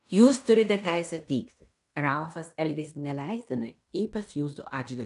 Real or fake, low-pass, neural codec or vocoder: fake; 10.8 kHz; codec, 16 kHz in and 24 kHz out, 0.9 kbps, LongCat-Audio-Codec, fine tuned four codebook decoder